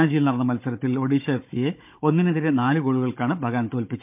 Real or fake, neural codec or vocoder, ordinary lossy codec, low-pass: fake; codec, 16 kHz, 16 kbps, FunCodec, trained on Chinese and English, 50 frames a second; MP3, 32 kbps; 3.6 kHz